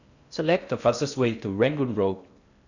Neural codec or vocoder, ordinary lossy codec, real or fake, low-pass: codec, 16 kHz in and 24 kHz out, 0.6 kbps, FocalCodec, streaming, 2048 codes; none; fake; 7.2 kHz